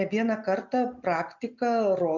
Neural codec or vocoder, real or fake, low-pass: none; real; 7.2 kHz